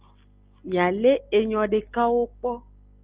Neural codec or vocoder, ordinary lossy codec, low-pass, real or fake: none; Opus, 24 kbps; 3.6 kHz; real